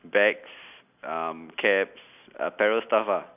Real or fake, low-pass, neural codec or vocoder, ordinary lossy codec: real; 3.6 kHz; none; none